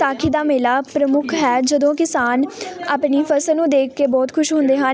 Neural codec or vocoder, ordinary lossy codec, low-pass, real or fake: none; none; none; real